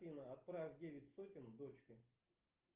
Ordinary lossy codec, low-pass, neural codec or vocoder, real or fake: Opus, 32 kbps; 3.6 kHz; none; real